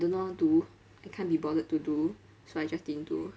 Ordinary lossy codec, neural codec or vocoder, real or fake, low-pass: none; none; real; none